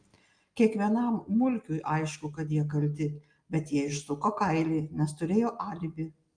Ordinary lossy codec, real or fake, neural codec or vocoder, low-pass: Opus, 32 kbps; real; none; 9.9 kHz